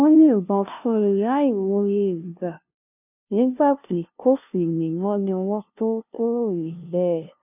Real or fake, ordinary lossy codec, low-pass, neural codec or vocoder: fake; none; 3.6 kHz; codec, 16 kHz, 0.5 kbps, FunCodec, trained on LibriTTS, 25 frames a second